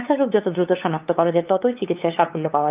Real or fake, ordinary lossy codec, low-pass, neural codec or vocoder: fake; Opus, 24 kbps; 3.6 kHz; codec, 16 kHz, 8 kbps, FunCodec, trained on LibriTTS, 25 frames a second